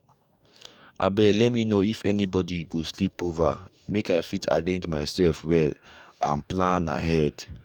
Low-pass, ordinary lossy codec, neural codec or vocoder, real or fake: 19.8 kHz; none; codec, 44.1 kHz, 2.6 kbps, DAC; fake